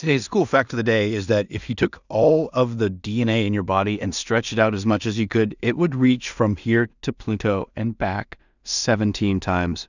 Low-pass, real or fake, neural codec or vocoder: 7.2 kHz; fake; codec, 16 kHz in and 24 kHz out, 0.4 kbps, LongCat-Audio-Codec, two codebook decoder